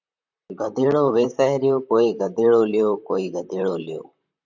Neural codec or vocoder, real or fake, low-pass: vocoder, 44.1 kHz, 128 mel bands, Pupu-Vocoder; fake; 7.2 kHz